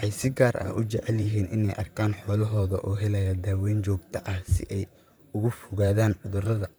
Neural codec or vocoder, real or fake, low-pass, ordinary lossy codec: vocoder, 44.1 kHz, 128 mel bands, Pupu-Vocoder; fake; none; none